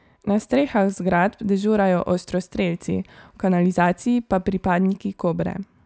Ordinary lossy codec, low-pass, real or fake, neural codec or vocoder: none; none; real; none